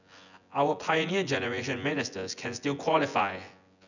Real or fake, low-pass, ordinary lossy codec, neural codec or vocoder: fake; 7.2 kHz; none; vocoder, 24 kHz, 100 mel bands, Vocos